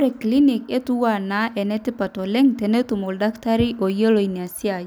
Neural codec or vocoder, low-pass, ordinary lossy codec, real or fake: none; none; none; real